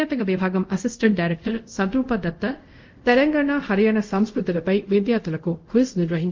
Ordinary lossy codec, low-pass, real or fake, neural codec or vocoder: Opus, 24 kbps; 7.2 kHz; fake; codec, 24 kHz, 0.5 kbps, DualCodec